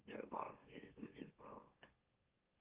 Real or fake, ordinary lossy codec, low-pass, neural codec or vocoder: fake; Opus, 32 kbps; 3.6 kHz; autoencoder, 44.1 kHz, a latent of 192 numbers a frame, MeloTTS